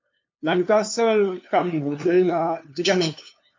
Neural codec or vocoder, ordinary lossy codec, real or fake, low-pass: codec, 16 kHz, 2 kbps, FunCodec, trained on LibriTTS, 25 frames a second; MP3, 48 kbps; fake; 7.2 kHz